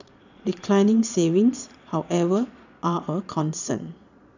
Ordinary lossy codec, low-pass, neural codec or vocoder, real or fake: none; 7.2 kHz; vocoder, 22.05 kHz, 80 mel bands, Vocos; fake